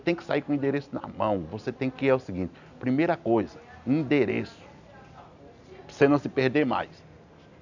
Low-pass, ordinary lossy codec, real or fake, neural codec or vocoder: 7.2 kHz; none; real; none